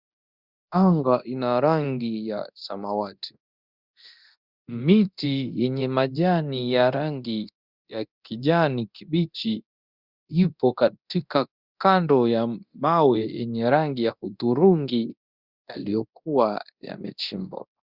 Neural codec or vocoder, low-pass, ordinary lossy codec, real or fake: codec, 24 kHz, 0.9 kbps, DualCodec; 5.4 kHz; Opus, 64 kbps; fake